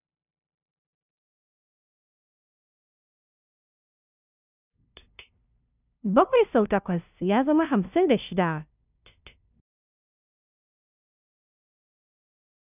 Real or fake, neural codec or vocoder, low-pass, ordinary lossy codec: fake; codec, 16 kHz, 0.5 kbps, FunCodec, trained on LibriTTS, 25 frames a second; 3.6 kHz; none